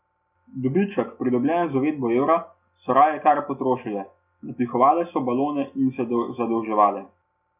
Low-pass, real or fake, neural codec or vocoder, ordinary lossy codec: 3.6 kHz; real; none; none